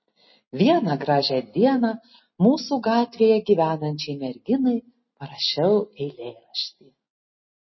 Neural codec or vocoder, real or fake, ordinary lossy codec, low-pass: none; real; MP3, 24 kbps; 7.2 kHz